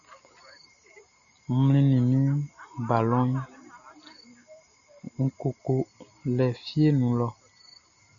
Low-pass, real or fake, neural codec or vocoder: 7.2 kHz; real; none